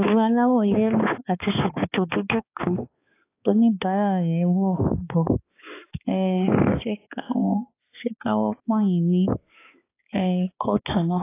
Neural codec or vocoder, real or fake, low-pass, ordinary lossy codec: codec, 16 kHz, 2 kbps, X-Codec, HuBERT features, trained on balanced general audio; fake; 3.6 kHz; AAC, 24 kbps